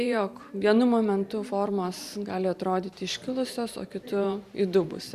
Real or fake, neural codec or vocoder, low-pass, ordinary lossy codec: fake; vocoder, 44.1 kHz, 128 mel bands every 512 samples, BigVGAN v2; 14.4 kHz; Opus, 64 kbps